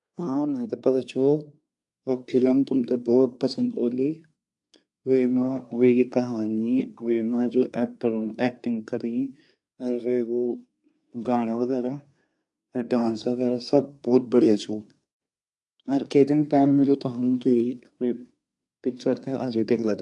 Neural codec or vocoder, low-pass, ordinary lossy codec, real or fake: codec, 24 kHz, 1 kbps, SNAC; 10.8 kHz; AAC, 64 kbps; fake